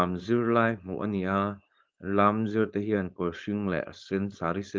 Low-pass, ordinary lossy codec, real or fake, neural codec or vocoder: 7.2 kHz; Opus, 32 kbps; fake; codec, 16 kHz, 4.8 kbps, FACodec